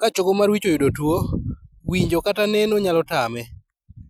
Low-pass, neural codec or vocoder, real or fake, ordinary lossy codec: 19.8 kHz; none; real; none